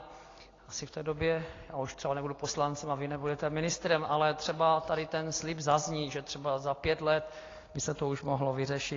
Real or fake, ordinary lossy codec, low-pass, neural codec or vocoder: real; AAC, 32 kbps; 7.2 kHz; none